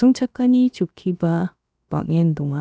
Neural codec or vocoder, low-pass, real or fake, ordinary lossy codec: codec, 16 kHz, about 1 kbps, DyCAST, with the encoder's durations; none; fake; none